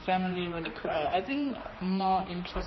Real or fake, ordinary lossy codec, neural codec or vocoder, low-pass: fake; MP3, 24 kbps; codec, 16 kHz, 2 kbps, X-Codec, HuBERT features, trained on general audio; 7.2 kHz